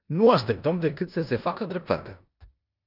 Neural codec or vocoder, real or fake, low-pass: codec, 16 kHz in and 24 kHz out, 0.9 kbps, LongCat-Audio-Codec, four codebook decoder; fake; 5.4 kHz